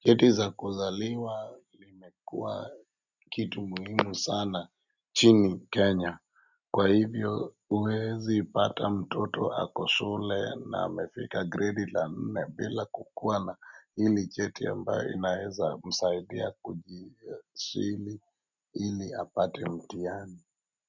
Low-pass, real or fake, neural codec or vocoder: 7.2 kHz; real; none